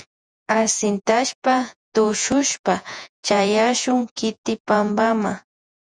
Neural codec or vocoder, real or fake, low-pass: vocoder, 48 kHz, 128 mel bands, Vocos; fake; 9.9 kHz